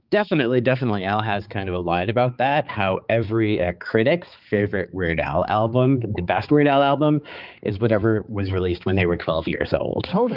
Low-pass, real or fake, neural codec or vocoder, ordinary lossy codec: 5.4 kHz; fake; codec, 16 kHz, 4 kbps, X-Codec, HuBERT features, trained on balanced general audio; Opus, 24 kbps